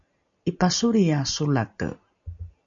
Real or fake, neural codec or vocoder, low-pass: real; none; 7.2 kHz